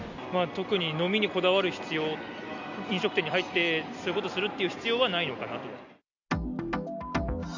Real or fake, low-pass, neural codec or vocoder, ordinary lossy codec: real; 7.2 kHz; none; none